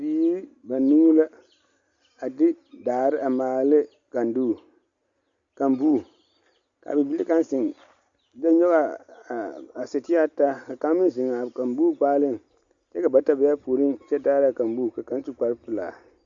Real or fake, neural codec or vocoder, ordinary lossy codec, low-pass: real; none; Opus, 64 kbps; 7.2 kHz